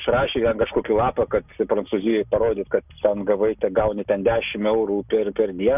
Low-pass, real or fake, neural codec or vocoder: 3.6 kHz; real; none